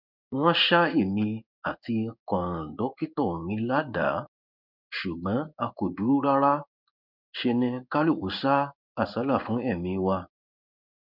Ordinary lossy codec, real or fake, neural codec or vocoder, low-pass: none; fake; codec, 16 kHz in and 24 kHz out, 1 kbps, XY-Tokenizer; 5.4 kHz